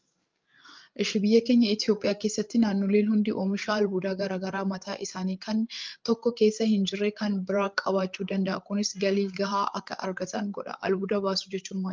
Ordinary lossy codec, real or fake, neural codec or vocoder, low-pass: Opus, 24 kbps; fake; codec, 16 kHz, 8 kbps, FreqCodec, larger model; 7.2 kHz